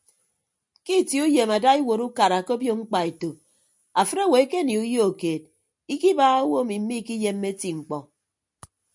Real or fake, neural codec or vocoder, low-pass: real; none; 10.8 kHz